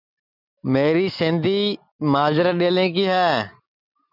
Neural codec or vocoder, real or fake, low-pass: none; real; 5.4 kHz